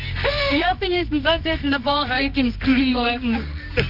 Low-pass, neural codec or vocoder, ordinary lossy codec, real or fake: 5.4 kHz; codec, 24 kHz, 0.9 kbps, WavTokenizer, medium music audio release; none; fake